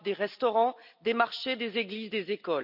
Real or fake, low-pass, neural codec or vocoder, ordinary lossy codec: real; 5.4 kHz; none; none